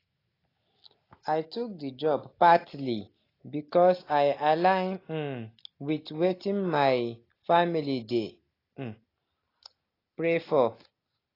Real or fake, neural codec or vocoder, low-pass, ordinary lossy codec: real; none; 5.4 kHz; AAC, 24 kbps